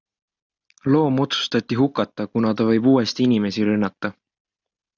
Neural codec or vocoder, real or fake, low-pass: none; real; 7.2 kHz